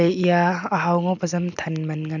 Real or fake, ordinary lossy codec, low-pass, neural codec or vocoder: real; none; 7.2 kHz; none